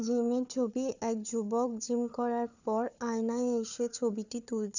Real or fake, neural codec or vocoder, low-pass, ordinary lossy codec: fake; codec, 16 kHz, 4 kbps, FunCodec, trained on Chinese and English, 50 frames a second; 7.2 kHz; none